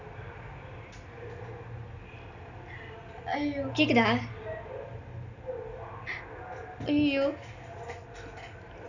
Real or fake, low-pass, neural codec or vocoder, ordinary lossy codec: real; 7.2 kHz; none; none